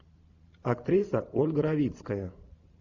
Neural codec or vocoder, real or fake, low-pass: vocoder, 44.1 kHz, 128 mel bands every 512 samples, BigVGAN v2; fake; 7.2 kHz